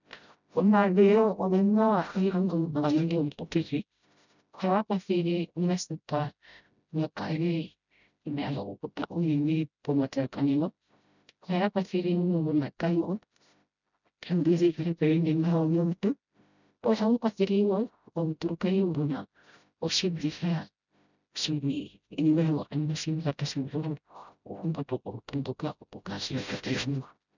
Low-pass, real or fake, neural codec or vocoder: 7.2 kHz; fake; codec, 16 kHz, 0.5 kbps, FreqCodec, smaller model